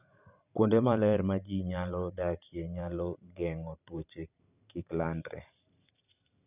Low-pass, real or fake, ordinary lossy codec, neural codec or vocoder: 3.6 kHz; fake; none; vocoder, 44.1 kHz, 128 mel bands every 512 samples, BigVGAN v2